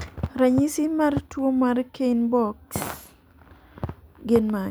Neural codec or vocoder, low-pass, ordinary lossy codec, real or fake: none; none; none; real